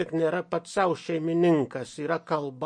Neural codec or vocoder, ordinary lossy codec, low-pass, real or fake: none; MP3, 48 kbps; 9.9 kHz; real